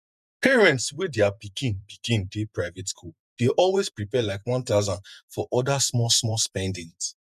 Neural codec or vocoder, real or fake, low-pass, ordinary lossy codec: vocoder, 48 kHz, 128 mel bands, Vocos; fake; 14.4 kHz; none